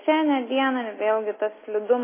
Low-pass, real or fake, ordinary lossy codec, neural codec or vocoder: 3.6 kHz; real; MP3, 16 kbps; none